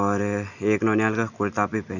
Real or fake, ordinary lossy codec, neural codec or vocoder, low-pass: real; none; none; 7.2 kHz